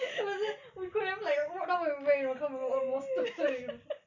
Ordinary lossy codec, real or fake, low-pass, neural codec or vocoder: none; real; 7.2 kHz; none